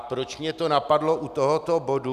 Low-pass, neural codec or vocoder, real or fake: 14.4 kHz; none; real